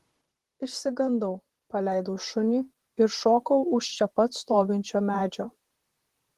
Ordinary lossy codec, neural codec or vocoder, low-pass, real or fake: Opus, 16 kbps; vocoder, 44.1 kHz, 128 mel bands, Pupu-Vocoder; 14.4 kHz; fake